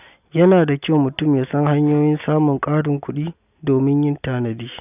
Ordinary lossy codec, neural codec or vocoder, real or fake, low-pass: none; none; real; 3.6 kHz